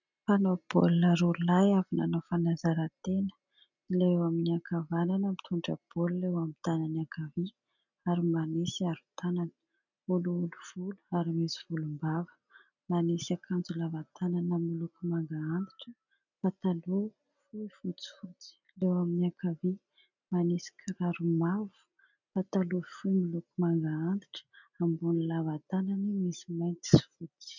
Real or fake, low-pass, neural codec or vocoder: real; 7.2 kHz; none